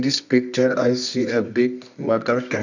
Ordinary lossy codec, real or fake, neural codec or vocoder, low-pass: none; fake; codec, 24 kHz, 0.9 kbps, WavTokenizer, medium music audio release; 7.2 kHz